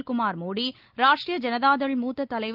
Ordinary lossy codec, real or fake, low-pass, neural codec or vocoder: Opus, 32 kbps; real; 5.4 kHz; none